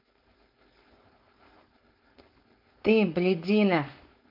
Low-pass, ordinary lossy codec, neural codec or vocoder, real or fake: 5.4 kHz; AAC, 48 kbps; codec, 16 kHz, 4.8 kbps, FACodec; fake